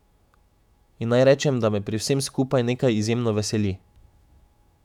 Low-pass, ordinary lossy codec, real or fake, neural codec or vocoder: 19.8 kHz; none; fake; autoencoder, 48 kHz, 128 numbers a frame, DAC-VAE, trained on Japanese speech